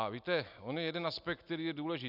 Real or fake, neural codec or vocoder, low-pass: real; none; 5.4 kHz